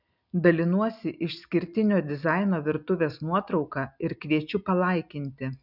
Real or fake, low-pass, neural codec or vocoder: real; 5.4 kHz; none